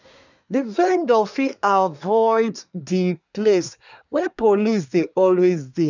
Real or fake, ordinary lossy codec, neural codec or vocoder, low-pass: fake; none; codec, 24 kHz, 1 kbps, SNAC; 7.2 kHz